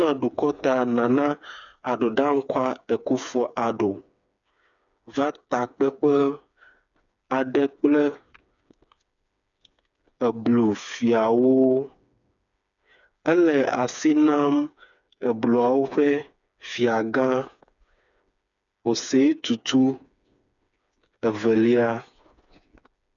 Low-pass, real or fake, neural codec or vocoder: 7.2 kHz; fake; codec, 16 kHz, 4 kbps, FreqCodec, smaller model